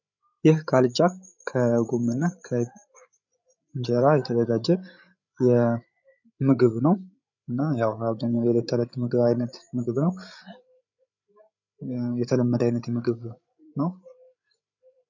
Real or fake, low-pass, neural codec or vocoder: fake; 7.2 kHz; codec, 16 kHz, 8 kbps, FreqCodec, larger model